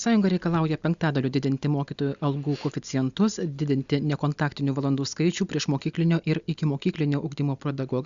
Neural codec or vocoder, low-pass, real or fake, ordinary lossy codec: none; 7.2 kHz; real; Opus, 64 kbps